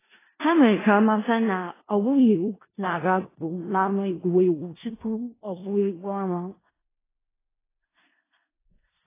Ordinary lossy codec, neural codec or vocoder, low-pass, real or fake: AAC, 16 kbps; codec, 16 kHz in and 24 kHz out, 0.4 kbps, LongCat-Audio-Codec, four codebook decoder; 3.6 kHz; fake